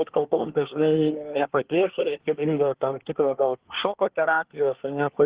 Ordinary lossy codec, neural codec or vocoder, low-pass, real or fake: Opus, 16 kbps; codec, 24 kHz, 1 kbps, SNAC; 3.6 kHz; fake